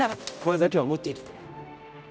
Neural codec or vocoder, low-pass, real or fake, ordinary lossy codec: codec, 16 kHz, 0.5 kbps, X-Codec, HuBERT features, trained on general audio; none; fake; none